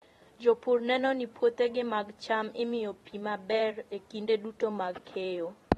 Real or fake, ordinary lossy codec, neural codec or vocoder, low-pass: fake; AAC, 32 kbps; vocoder, 44.1 kHz, 128 mel bands every 512 samples, BigVGAN v2; 19.8 kHz